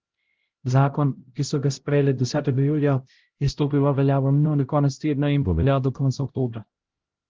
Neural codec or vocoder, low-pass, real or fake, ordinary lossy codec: codec, 16 kHz, 0.5 kbps, X-Codec, HuBERT features, trained on LibriSpeech; 7.2 kHz; fake; Opus, 16 kbps